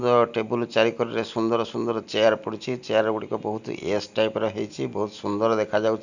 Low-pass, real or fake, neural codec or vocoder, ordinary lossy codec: 7.2 kHz; real; none; none